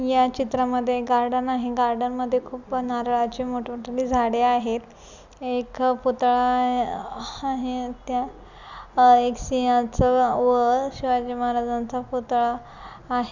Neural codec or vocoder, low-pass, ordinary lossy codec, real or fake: none; 7.2 kHz; none; real